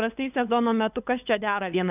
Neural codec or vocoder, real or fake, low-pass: codec, 16 kHz, 8 kbps, FunCodec, trained on LibriTTS, 25 frames a second; fake; 3.6 kHz